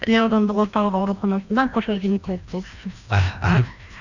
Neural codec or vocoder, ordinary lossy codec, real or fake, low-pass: codec, 16 kHz, 1 kbps, FreqCodec, larger model; none; fake; 7.2 kHz